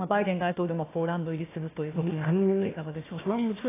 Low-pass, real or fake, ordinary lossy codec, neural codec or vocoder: 3.6 kHz; fake; AAC, 16 kbps; codec, 16 kHz, 1 kbps, FunCodec, trained on Chinese and English, 50 frames a second